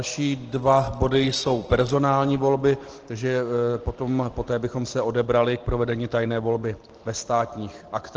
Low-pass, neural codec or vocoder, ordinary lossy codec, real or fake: 7.2 kHz; none; Opus, 16 kbps; real